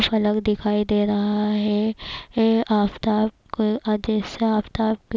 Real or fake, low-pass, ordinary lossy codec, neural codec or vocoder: real; none; none; none